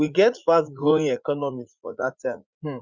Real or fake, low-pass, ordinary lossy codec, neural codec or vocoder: fake; 7.2 kHz; Opus, 64 kbps; vocoder, 44.1 kHz, 80 mel bands, Vocos